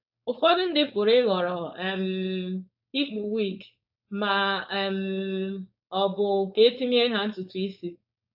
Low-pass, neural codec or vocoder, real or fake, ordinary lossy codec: 5.4 kHz; codec, 16 kHz, 4.8 kbps, FACodec; fake; AAC, 48 kbps